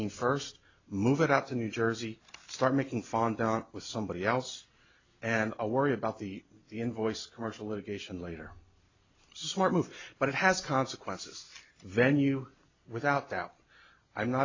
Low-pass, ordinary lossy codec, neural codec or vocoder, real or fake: 7.2 kHz; AAC, 48 kbps; none; real